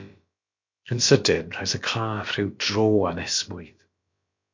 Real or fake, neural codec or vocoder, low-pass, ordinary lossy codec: fake; codec, 16 kHz, about 1 kbps, DyCAST, with the encoder's durations; 7.2 kHz; MP3, 48 kbps